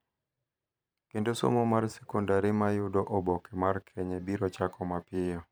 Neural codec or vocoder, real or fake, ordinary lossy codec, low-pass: vocoder, 44.1 kHz, 128 mel bands every 512 samples, BigVGAN v2; fake; none; none